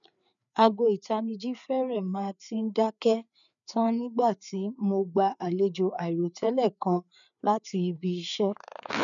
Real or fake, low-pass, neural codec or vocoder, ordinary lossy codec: fake; 7.2 kHz; codec, 16 kHz, 4 kbps, FreqCodec, larger model; none